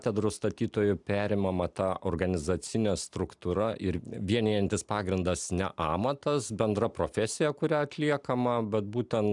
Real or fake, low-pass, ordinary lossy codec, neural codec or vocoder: real; 10.8 kHz; MP3, 96 kbps; none